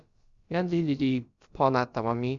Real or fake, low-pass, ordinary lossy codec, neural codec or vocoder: fake; 7.2 kHz; Opus, 64 kbps; codec, 16 kHz, about 1 kbps, DyCAST, with the encoder's durations